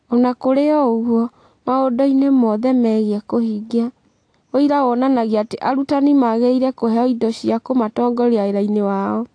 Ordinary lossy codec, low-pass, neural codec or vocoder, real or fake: AAC, 48 kbps; 9.9 kHz; none; real